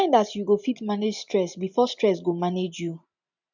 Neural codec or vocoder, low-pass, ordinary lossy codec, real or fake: none; 7.2 kHz; none; real